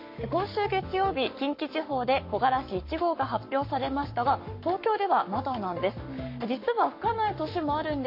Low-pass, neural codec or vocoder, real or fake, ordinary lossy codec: 5.4 kHz; codec, 44.1 kHz, 7.8 kbps, Pupu-Codec; fake; MP3, 32 kbps